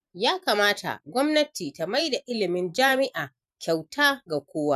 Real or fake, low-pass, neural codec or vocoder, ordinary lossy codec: fake; 14.4 kHz; vocoder, 44.1 kHz, 128 mel bands every 512 samples, BigVGAN v2; none